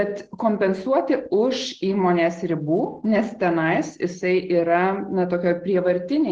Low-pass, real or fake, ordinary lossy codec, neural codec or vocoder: 9.9 kHz; real; Opus, 16 kbps; none